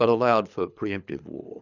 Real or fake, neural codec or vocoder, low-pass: fake; codec, 24 kHz, 6 kbps, HILCodec; 7.2 kHz